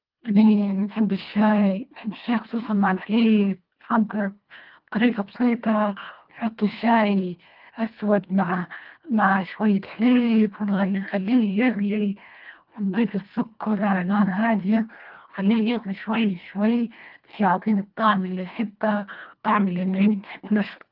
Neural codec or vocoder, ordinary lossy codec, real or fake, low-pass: codec, 24 kHz, 1.5 kbps, HILCodec; Opus, 32 kbps; fake; 5.4 kHz